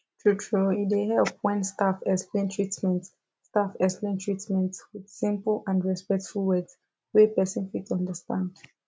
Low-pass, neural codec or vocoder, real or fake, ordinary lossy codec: none; none; real; none